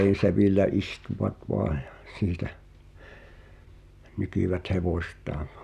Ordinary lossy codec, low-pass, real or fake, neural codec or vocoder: none; 14.4 kHz; real; none